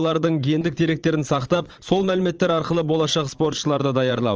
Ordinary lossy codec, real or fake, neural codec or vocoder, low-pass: Opus, 24 kbps; fake; vocoder, 22.05 kHz, 80 mel bands, Vocos; 7.2 kHz